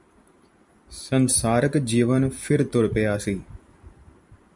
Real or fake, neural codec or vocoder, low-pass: fake; vocoder, 44.1 kHz, 128 mel bands every 256 samples, BigVGAN v2; 10.8 kHz